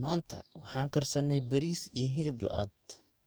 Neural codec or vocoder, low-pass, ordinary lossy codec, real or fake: codec, 44.1 kHz, 2.6 kbps, DAC; none; none; fake